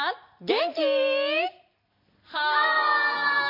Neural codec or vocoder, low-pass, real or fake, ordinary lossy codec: none; 5.4 kHz; real; MP3, 32 kbps